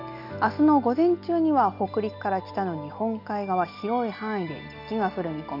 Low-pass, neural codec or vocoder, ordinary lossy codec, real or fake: 5.4 kHz; none; none; real